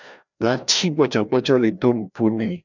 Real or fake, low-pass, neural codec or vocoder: fake; 7.2 kHz; codec, 16 kHz, 1 kbps, FreqCodec, larger model